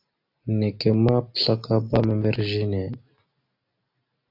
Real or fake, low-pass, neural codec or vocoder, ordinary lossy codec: real; 5.4 kHz; none; AAC, 32 kbps